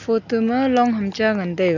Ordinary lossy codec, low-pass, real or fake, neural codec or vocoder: none; 7.2 kHz; real; none